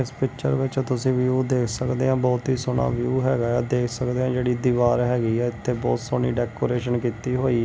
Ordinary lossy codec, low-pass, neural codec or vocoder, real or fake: none; none; none; real